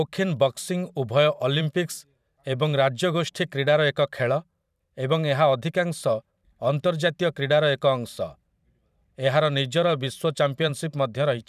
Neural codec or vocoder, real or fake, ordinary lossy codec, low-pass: vocoder, 44.1 kHz, 128 mel bands every 512 samples, BigVGAN v2; fake; none; 14.4 kHz